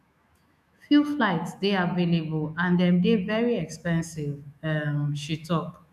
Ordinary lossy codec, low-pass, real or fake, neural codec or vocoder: none; 14.4 kHz; fake; autoencoder, 48 kHz, 128 numbers a frame, DAC-VAE, trained on Japanese speech